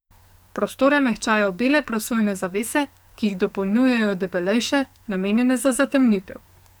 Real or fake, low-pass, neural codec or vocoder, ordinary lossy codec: fake; none; codec, 44.1 kHz, 2.6 kbps, SNAC; none